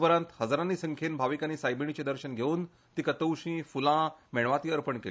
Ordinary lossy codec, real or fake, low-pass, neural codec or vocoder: none; real; none; none